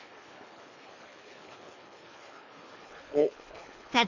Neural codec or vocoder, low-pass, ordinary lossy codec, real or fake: codec, 24 kHz, 3 kbps, HILCodec; 7.2 kHz; none; fake